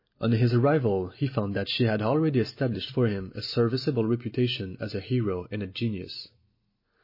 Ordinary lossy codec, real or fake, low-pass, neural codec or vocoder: MP3, 24 kbps; real; 5.4 kHz; none